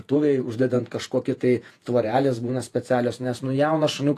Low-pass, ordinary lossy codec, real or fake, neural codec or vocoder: 14.4 kHz; AAC, 64 kbps; fake; vocoder, 48 kHz, 128 mel bands, Vocos